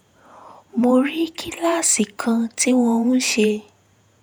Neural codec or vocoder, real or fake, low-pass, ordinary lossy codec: vocoder, 48 kHz, 128 mel bands, Vocos; fake; none; none